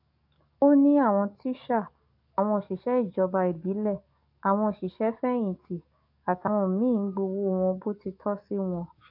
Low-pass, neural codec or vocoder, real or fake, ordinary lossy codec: 5.4 kHz; codec, 44.1 kHz, 7.8 kbps, DAC; fake; AAC, 48 kbps